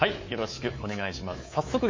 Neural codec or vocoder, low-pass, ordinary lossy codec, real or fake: codec, 24 kHz, 3.1 kbps, DualCodec; 7.2 kHz; MP3, 32 kbps; fake